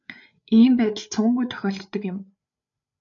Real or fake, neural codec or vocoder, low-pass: fake; codec, 16 kHz, 8 kbps, FreqCodec, larger model; 7.2 kHz